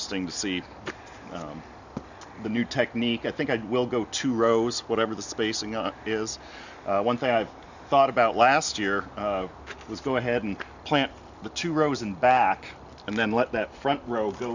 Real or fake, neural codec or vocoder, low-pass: real; none; 7.2 kHz